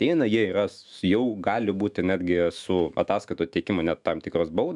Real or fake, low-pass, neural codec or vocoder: fake; 10.8 kHz; autoencoder, 48 kHz, 128 numbers a frame, DAC-VAE, trained on Japanese speech